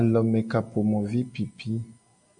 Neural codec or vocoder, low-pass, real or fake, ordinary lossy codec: none; 9.9 kHz; real; AAC, 64 kbps